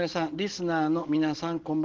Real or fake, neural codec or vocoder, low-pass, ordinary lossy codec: real; none; 7.2 kHz; Opus, 16 kbps